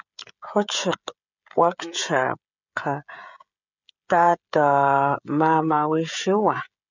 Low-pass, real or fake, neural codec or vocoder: 7.2 kHz; fake; codec, 16 kHz, 16 kbps, FreqCodec, smaller model